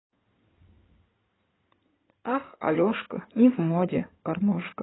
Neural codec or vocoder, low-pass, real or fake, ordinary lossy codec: codec, 16 kHz in and 24 kHz out, 2.2 kbps, FireRedTTS-2 codec; 7.2 kHz; fake; AAC, 16 kbps